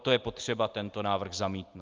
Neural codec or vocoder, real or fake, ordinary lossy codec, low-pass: none; real; Opus, 32 kbps; 7.2 kHz